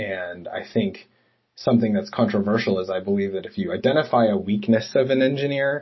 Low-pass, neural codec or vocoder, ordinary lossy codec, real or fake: 7.2 kHz; none; MP3, 24 kbps; real